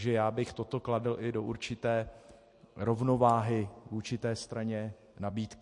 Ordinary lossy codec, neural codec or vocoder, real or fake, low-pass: MP3, 48 kbps; none; real; 10.8 kHz